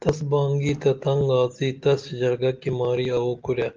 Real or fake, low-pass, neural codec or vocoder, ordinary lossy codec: real; 7.2 kHz; none; Opus, 32 kbps